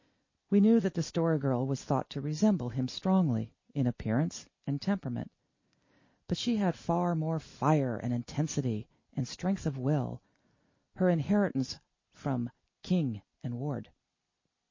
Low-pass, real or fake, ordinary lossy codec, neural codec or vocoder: 7.2 kHz; real; MP3, 32 kbps; none